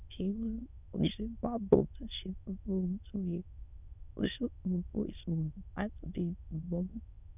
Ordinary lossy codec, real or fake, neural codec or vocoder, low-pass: none; fake; autoencoder, 22.05 kHz, a latent of 192 numbers a frame, VITS, trained on many speakers; 3.6 kHz